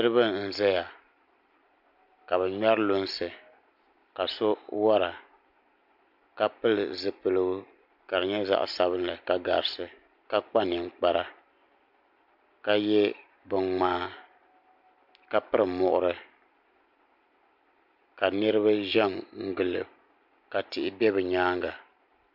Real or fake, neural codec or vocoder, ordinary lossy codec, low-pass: real; none; MP3, 48 kbps; 5.4 kHz